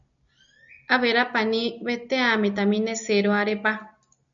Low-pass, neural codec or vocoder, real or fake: 7.2 kHz; none; real